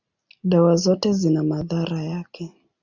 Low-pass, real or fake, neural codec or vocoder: 7.2 kHz; real; none